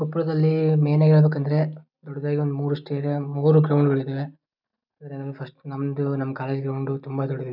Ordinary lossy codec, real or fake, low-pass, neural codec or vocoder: none; real; 5.4 kHz; none